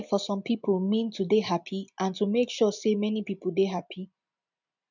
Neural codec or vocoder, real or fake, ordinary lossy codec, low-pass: none; real; none; 7.2 kHz